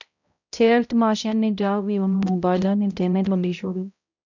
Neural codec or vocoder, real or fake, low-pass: codec, 16 kHz, 0.5 kbps, X-Codec, HuBERT features, trained on balanced general audio; fake; 7.2 kHz